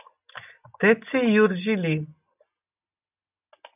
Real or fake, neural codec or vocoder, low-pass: real; none; 3.6 kHz